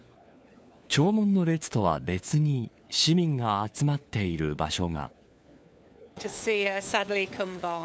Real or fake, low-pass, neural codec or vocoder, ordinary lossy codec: fake; none; codec, 16 kHz, 4 kbps, FunCodec, trained on LibriTTS, 50 frames a second; none